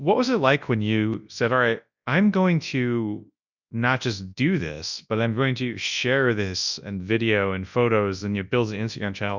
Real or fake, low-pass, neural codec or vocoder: fake; 7.2 kHz; codec, 24 kHz, 0.9 kbps, WavTokenizer, large speech release